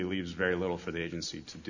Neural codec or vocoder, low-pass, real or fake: none; 7.2 kHz; real